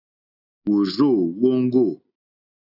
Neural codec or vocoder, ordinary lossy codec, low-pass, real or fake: none; AAC, 48 kbps; 5.4 kHz; real